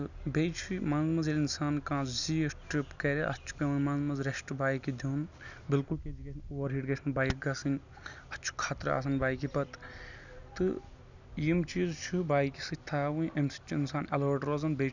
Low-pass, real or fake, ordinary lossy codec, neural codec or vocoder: 7.2 kHz; real; none; none